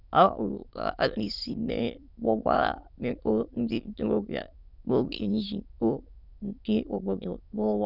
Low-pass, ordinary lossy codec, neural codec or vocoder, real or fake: 5.4 kHz; none; autoencoder, 22.05 kHz, a latent of 192 numbers a frame, VITS, trained on many speakers; fake